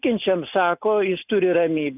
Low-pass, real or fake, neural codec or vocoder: 3.6 kHz; real; none